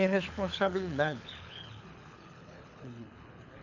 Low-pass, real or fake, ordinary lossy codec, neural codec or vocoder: 7.2 kHz; fake; none; codec, 24 kHz, 6 kbps, HILCodec